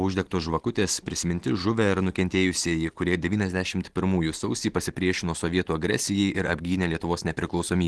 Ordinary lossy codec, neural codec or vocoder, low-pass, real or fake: Opus, 16 kbps; none; 10.8 kHz; real